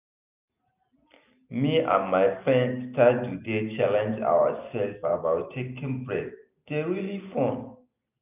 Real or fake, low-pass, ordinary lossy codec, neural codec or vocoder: real; 3.6 kHz; none; none